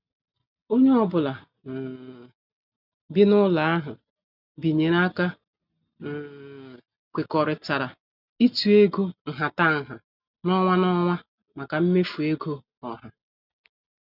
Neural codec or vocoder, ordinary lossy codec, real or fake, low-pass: none; none; real; 5.4 kHz